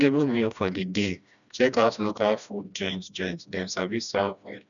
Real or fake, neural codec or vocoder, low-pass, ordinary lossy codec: fake; codec, 16 kHz, 1 kbps, FreqCodec, smaller model; 7.2 kHz; none